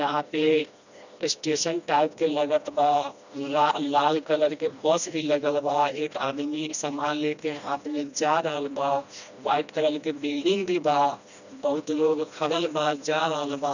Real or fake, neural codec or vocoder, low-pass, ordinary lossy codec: fake; codec, 16 kHz, 1 kbps, FreqCodec, smaller model; 7.2 kHz; none